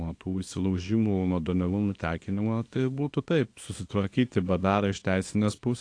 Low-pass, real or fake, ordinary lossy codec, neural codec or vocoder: 9.9 kHz; fake; AAC, 48 kbps; codec, 24 kHz, 0.9 kbps, WavTokenizer, small release